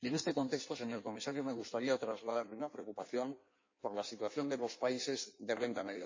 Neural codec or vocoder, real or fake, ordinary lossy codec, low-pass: codec, 16 kHz in and 24 kHz out, 1.1 kbps, FireRedTTS-2 codec; fake; MP3, 32 kbps; 7.2 kHz